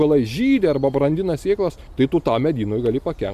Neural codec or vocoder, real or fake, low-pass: none; real; 14.4 kHz